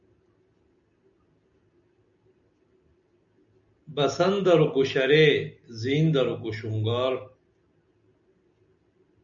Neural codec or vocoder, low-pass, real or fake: none; 7.2 kHz; real